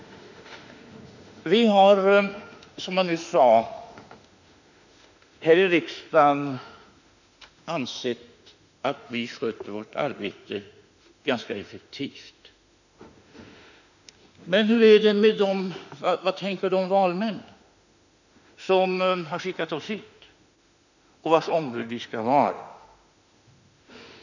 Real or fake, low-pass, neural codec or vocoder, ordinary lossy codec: fake; 7.2 kHz; autoencoder, 48 kHz, 32 numbers a frame, DAC-VAE, trained on Japanese speech; none